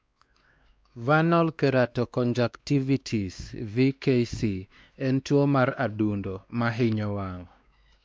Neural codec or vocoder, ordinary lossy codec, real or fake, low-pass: codec, 16 kHz, 2 kbps, X-Codec, WavLM features, trained on Multilingual LibriSpeech; none; fake; none